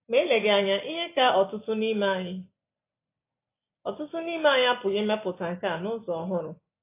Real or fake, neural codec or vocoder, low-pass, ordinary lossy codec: real; none; 3.6 kHz; AAC, 24 kbps